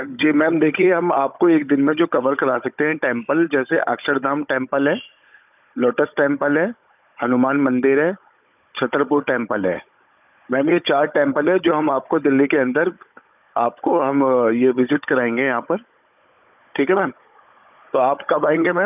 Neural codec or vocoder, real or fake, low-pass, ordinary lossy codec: codec, 16 kHz, 16 kbps, FunCodec, trained on Chinese and English, 50 frames a second; fake; 3.6 kHz; none